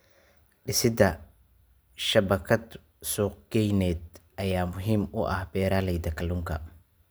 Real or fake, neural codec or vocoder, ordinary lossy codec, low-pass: real; none; none; none